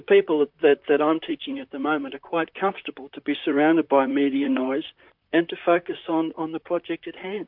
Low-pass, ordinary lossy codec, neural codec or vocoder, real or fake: 5.4 kHz; MP3, 48 kbps; vocoder, 44.1 kHz, 128 mel bands, Pupu-Vocoder; fake